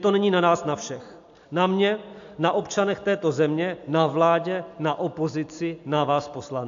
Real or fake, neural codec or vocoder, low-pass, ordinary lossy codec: real; none; 7.2 kHz; AAC, 64 kbps